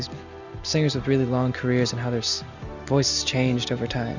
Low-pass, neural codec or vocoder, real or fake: 7.2 kHz; none; real